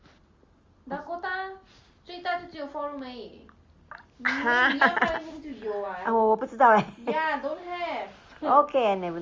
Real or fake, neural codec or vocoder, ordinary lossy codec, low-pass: real; none; Opus, 32 kbps; 7.2 kHz